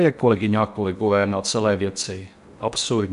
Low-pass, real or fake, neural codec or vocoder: 10.8 kHz; fake; codec, 16 kHz in and 24 kHz out, 0.6 kbps, FocalCodec, streaming, 4096 codes